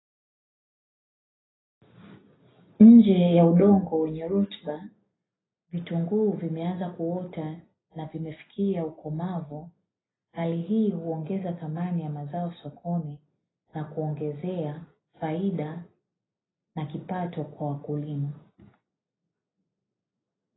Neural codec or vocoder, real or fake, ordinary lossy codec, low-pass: none; real; AAC, 16 kbps; 7.2 kHz